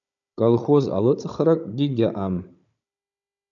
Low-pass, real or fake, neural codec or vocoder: 7.2 kHz; fake; codec, 16 kHz, 16 kbps, FunCodec, trained on Chinese and English, 50 frames a second